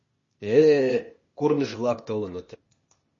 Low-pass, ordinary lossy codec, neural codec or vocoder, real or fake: 7.2 kHz; MP3, 32 kbps; codec, 16 kHz, 0.8 kbps, ZipCodec; fake